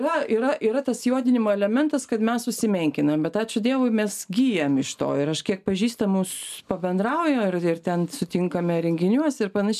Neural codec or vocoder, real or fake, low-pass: none; real; 14.4 kHz